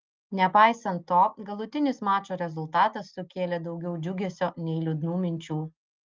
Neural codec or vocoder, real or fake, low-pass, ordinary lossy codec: none; real; 7.2 kHz; Opus, 32 kbps